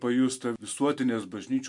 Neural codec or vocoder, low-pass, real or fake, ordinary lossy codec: none; 10.8 kHz; real; MP3, 64 kbps